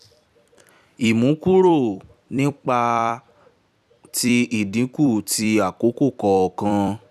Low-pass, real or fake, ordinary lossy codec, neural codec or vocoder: 14.4 kHz; fake; none; vocoder, 44.1 kHz, 128 mel bands every 256 samples, BigVGAN v2